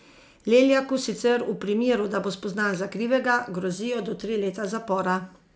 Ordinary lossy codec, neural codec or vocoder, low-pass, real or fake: none; none; none; real